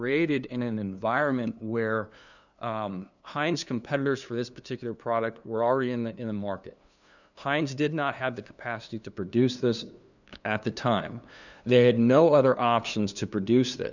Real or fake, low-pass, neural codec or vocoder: fake; 7.2 kHz; codec, 16 kHz, 2 kbps, FunCodec, trained on LibriTTS, 25 frames a second